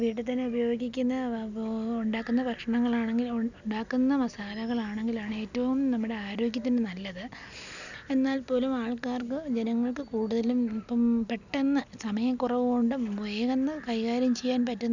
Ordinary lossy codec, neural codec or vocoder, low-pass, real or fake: none; none; 7.2 kHz; real